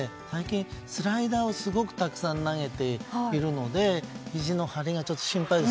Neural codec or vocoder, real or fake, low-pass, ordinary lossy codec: none; real; none; none